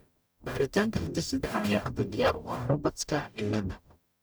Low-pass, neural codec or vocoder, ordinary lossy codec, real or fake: none; codec, 44.1 kHz, 0.9 kbps, DAC; none; fake